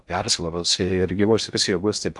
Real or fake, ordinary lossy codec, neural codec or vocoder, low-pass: fake; MP3, 96 kbps; codec, 16 kHz in and 24 kHz out, 0.6 kbps, FocalCodec, streaming, 4096 codes; 10.8 kHz